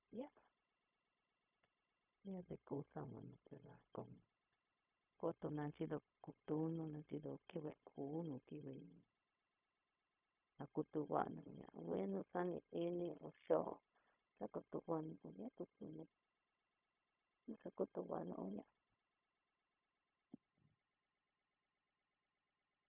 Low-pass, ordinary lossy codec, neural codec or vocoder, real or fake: 3.6 kHz; none; codec, 16 kHz, 0.4 kbps, LongCat-Audio-Codec; fake